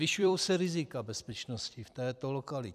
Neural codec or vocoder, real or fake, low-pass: vocoder, 44.1 kHz, 128 mel bands every 256 samples, BigVGAN v2; fake; 14.4 kHz